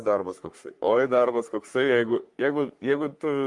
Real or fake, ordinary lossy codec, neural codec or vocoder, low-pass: fake; Opus, 32 kbps; codec, 44.1 kHz, 3.4 kbps, Pupu-Codec; 10.8 kHz